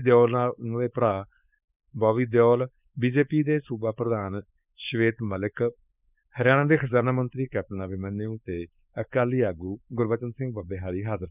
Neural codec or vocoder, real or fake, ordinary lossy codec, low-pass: codec, 16 kHz, 4.8 kbps, FACodec; fake; none; 3.6 kHz